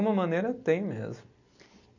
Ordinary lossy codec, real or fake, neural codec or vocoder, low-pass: none; real; none; 7.2 kHz